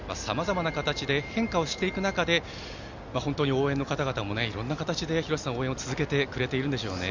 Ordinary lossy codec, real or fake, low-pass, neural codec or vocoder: Opus, 64 kbps; real; 7.2 kHz; none